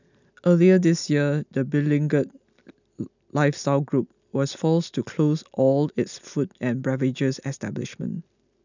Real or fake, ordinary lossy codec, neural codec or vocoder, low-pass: real; none; none; 7.2 kHz